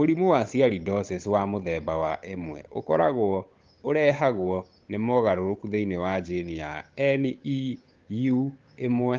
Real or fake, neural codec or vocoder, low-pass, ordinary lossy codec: fake; codec, 16 kHz, 8 kbps, FunCodec, trained on Chinese and English, 25 frames a second; 7.2 kHz; Opus, 32 kbps